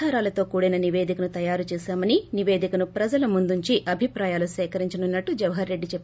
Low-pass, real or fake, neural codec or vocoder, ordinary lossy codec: none; real; none; none